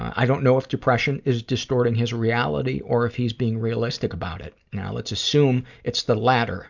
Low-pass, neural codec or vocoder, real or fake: 7.2 kHz; none; real